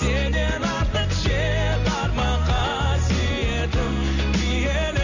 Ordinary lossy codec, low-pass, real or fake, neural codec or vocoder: none; 7.2 kHz; real; none